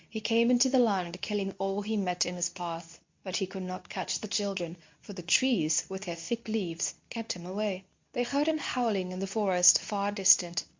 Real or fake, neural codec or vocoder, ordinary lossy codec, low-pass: fake; codec, 24 kHz, 0.9 kbps, WavTokenizer, medium speech release version 2; MP3, 64 kbps; 7.2 kHz